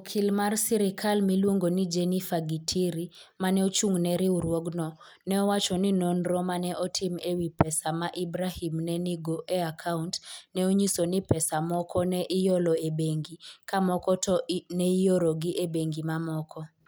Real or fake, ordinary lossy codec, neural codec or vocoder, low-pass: real; none; none; none